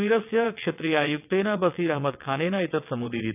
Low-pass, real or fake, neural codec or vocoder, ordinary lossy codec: 3.6 kHz; fake; vocoder, 22.05 kHz, 80 mel bands, WaveNeXt; none